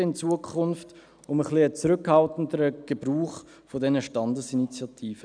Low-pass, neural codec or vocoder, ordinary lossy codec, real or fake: 9.9 kHz; none; none; real